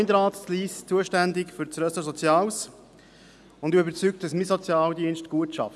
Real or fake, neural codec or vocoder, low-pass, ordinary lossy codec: real; none; none; none